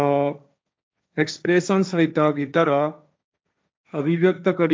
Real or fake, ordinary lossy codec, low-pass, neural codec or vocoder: fake; none; none; codec, 16 kHz, 1.1 kbps, Voila-Tokenizer